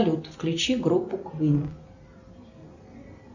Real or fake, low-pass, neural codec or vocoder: real; 7.2 kHz; none